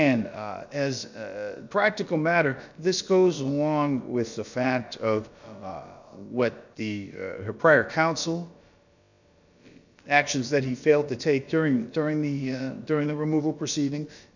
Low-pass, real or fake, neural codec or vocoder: 7.2 kHz; fake; codec, 16 kHz, about 1 kbps, DyCAST, with the encoder's durations